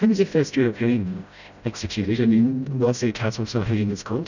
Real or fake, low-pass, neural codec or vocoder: fake; 7.2 kHz; codec, 16 kHz, 0.5 kbps, FreqCodec, smaller model